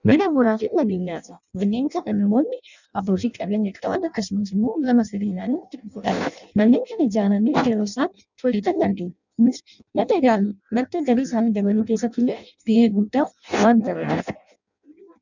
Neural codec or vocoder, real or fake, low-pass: codec, 16 kHz in and 24 kHz out, 0.6 kbps, FireRedTTS-2 codec; fake; 7.2 kHz